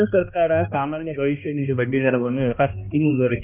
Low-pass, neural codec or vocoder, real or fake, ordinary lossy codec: 3.6 kHz; codec, 16 kHz, 1 kbps, X-Codec, HuBERT features, trained on balanced general audio; fake; none